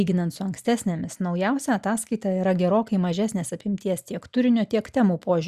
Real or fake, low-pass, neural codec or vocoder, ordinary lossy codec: real; 14.4 kHz; none; Opus, 64 kbps